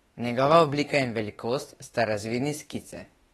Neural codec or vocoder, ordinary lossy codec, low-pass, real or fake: autoencoder, 48 kHz, 32 numbers a frame, DAC-VAE, trained on Japanese speech; AAC, 32 kbps; 19.8 kHz; fake